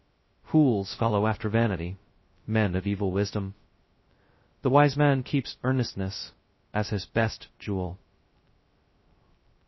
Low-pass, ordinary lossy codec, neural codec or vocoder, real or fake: 7.2 kHz; MP3, 24 kbps; codec, 16 kHz, 0.2 kbps, FocalCodec; fake